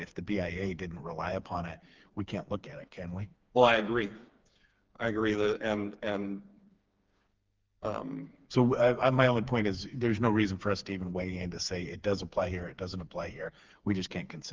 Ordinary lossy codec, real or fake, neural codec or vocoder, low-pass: Opus, 32 kbps; fake; codec, 16 kHz, 4 kbps, FreqCodec, smaller model; 7.2 kHz